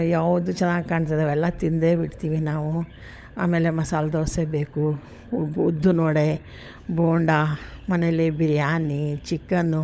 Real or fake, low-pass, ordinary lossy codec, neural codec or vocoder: fake; none; none; codec, 16 kHz, 16 kbps, FunCodec, trained on LibriTTS, 50 frames a second